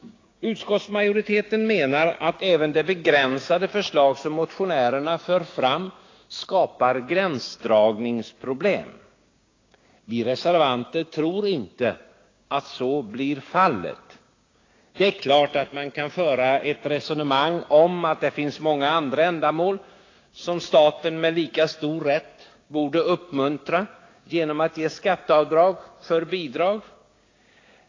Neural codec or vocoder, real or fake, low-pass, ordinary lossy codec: codec, 16 kHz, 6 kbps, DAC; fake; 7.2 kHz; AAC, 32 kbps